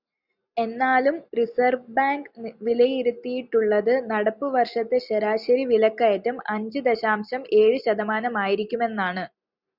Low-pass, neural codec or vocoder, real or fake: 5.4 kHz; none; real